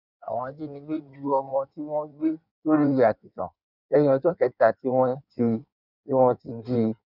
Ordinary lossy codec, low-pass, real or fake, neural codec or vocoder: none; 5.4 kHz; fake; codec, 16 kHz in and 24 kHz out, 1.1 kbps, FireRedTTS-2 codec